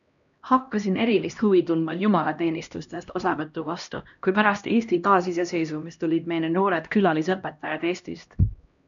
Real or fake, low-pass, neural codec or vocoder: fake; 7.2 kHz; codec, 16 kHz, 1 kbps, X-Codec, HuBERT features, trained on LibriSpeech